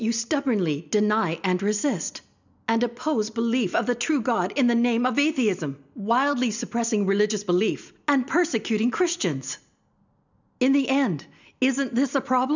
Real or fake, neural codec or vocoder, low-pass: real; none; 7.2 kHz